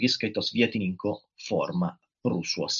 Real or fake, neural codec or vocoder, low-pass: real; none; 7.2 kHz